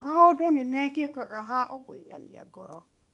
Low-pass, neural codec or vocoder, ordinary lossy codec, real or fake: 10.8 kHz; codec, 24 kHz, 0.9 kbps, WavTokenizer, small release; none; fake